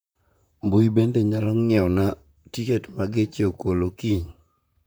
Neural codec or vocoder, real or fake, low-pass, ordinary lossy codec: vocoder, 44.1 kHz, 128 mel bands, Pupu-Vocoder; fake; none; none